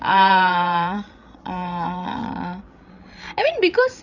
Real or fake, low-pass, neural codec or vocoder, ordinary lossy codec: fake; 7.2 kHz; codec, 16 kHz, 8 kbps, FreqCodec, larger model; none